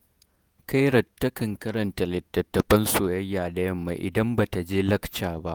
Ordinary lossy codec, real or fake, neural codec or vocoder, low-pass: none; fake; vocoder, 48 kHz, 128 mel bands, Vocos; none